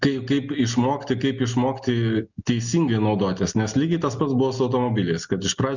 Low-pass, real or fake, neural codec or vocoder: 7.2 kHz; real; none